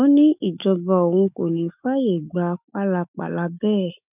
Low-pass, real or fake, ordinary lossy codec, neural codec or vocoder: 3.6 kHz; fake; none; autoencoder, 48 kHz, 128 numbers a frame, DAC-VAE, trained on Japanese speech